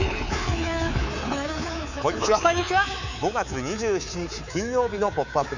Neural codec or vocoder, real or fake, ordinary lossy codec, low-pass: codec, 24 kHz, 3.1 kbps, DualCodec; fake; none; 7.2 kHz